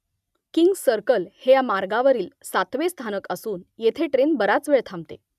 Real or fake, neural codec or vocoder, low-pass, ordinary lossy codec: real; none; 14.4 kHz; none